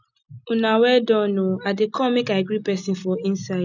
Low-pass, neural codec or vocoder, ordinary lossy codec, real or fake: 7.2 kHz; none; none; real